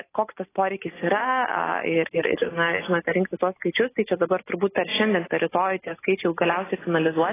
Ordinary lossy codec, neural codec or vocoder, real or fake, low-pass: AAC, 16 kbps; none; real; 3.6 kHz